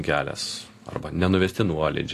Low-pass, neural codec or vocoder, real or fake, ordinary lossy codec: 14.4 kHz; none; real; AAC, 48 kbps